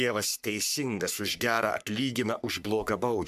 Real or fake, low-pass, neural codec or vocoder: fake; 14.4 kHz; codec, 44.1 kHz, 3.4 kbps, Pupu-Codec